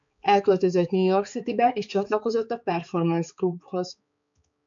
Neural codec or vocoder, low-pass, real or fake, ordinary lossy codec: codec, 16 kHz, 4 kbps, X-Codec, HuBERT features, trained on balanced general audio; 7.2 kHz; fake; MP3, 64 kbps